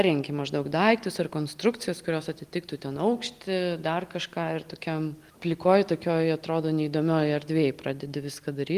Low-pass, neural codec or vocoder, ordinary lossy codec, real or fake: 14.4 kHz; none; Opus, 24 kbps; real